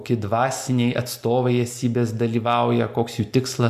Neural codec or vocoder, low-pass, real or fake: none; 14.4 kHz; real